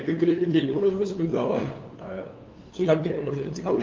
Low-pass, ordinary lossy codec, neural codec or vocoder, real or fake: 7.2 kHz; Opus, 32 kbps; codec, 16 kHz, 2 kbps, FunCodec, trained on LibriTTS, 25 frames a second; fake